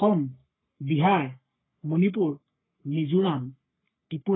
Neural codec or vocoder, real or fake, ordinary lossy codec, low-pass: codec, 44.1 kHz, 3.4 kbps, Pupu-Codec; fake; AAC, 16 kbps; 7.2 kHz